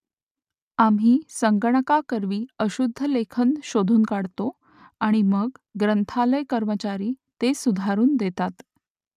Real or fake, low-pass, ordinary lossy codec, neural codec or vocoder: real; 14.4 kHz; none; none